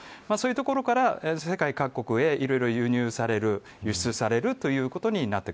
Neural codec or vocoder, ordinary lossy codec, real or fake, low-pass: none; none; real; none